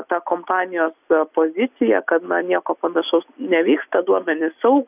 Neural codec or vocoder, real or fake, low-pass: none; real; 3.6 kHz